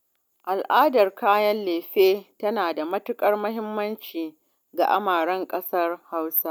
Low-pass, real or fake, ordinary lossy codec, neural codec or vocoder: none; real; none; none